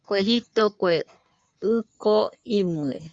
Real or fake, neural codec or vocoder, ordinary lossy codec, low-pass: fake; codec, 16 kHz in and 24 kHz out, 1.1 kbps, FireRedTTS-2 codec; Opus, 64 kbps; 9.9 kHz